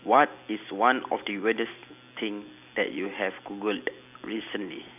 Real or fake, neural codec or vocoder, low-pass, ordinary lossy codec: real; none; 3.6 kHz; none